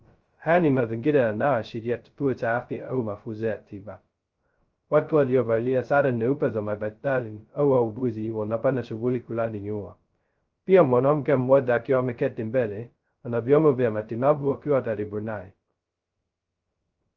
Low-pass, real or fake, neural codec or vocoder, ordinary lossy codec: 7.2 kHz; fake; codec, 16 kHz, 0.2 kbps, FocalCodec; Opus, 24 kbps